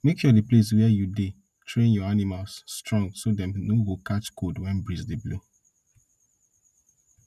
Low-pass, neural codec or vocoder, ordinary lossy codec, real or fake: 14.4 kHz; none; none; real